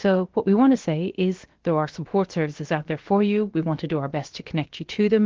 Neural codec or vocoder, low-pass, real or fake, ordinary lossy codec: codec, 16 kHz, about 1 kbps, DyCAST, with the encoder's durations; 7.2 kHz; fake; Opus, 16 kbps